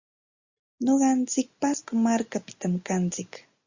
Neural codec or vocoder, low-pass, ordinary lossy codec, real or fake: none; 7.2 kHz; Opus, 64 kbps; real